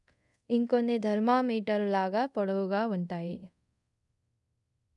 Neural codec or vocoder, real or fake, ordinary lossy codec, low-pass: codec, 24 kHz, 0.5 kbps, DualCodec; fake; none; 10.8 kHz